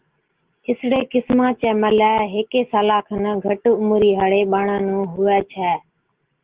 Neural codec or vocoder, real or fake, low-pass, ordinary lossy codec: none; real; 3.6 kHz; Opus, 16 kbps